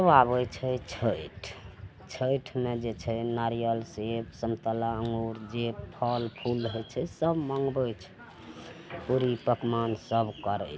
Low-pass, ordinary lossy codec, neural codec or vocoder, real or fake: none; none; none; real